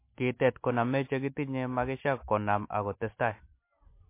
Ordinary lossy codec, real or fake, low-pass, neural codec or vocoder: MP3, 24 kbps; real; 3.6 kHz; none